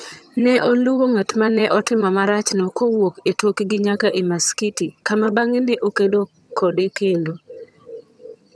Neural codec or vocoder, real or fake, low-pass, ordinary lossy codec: vocoder, 22.05 kHz, 80 mel bands, HiFi-GAN; fake; none; none